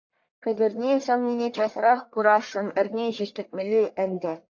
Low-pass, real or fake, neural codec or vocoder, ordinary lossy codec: 7.2 kHz; fake; codec, 44.1 kHz, 1.7 kbps, Pupu-Codec; AAC, 48 kbps